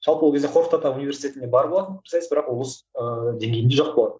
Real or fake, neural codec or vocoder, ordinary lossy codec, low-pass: real; none; none; none